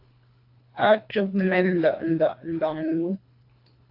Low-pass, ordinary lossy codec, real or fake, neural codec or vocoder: 5.4 kHz; AAC, 32 kbps; fake; codec, 24 kHz, 1.5 kbps, HILCodec